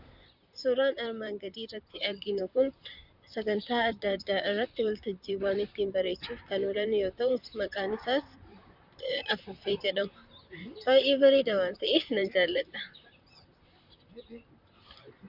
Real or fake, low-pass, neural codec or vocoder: fake; 5.4 kHz; vocoder, 44.1 kHz, 128 mel bands, Pupu-Vocoder